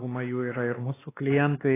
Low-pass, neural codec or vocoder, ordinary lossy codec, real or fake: 3.6 kHz; codec, 16 kHz, 2 kbps, X-Codec, WavLM features, trained on Multilingual LibriSpeech; AAC, 16 kbps; fake